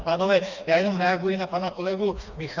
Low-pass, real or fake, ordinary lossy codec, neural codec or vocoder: 7.2 kHz; fake; Opus, 64 kbps; codec, 16 kHz, 2 kbps, FreqCodec, smaller model